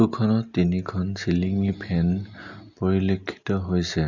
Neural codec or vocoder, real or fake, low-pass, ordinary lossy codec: none; real; 7.2 kHz; none